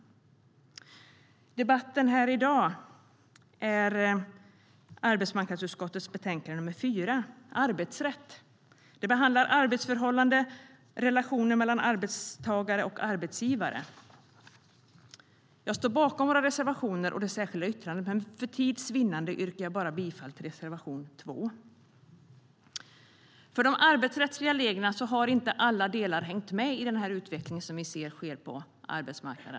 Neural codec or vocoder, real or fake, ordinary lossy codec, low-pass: none; real; none; none